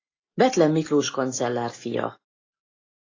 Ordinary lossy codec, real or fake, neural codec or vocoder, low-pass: AAC, 32 kbps; real; none; 7.2 kHz